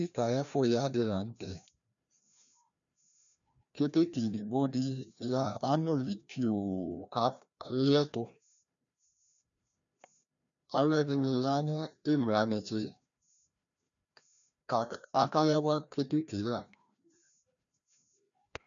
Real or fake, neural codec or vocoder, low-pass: fake; codec, 16 kHz, 1 kbps, FreqCodec, larger model; 7.2 kHz